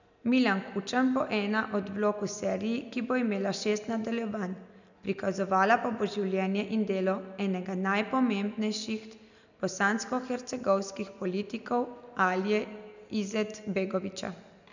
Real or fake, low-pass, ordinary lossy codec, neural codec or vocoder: real; 7.2 kHz; none; none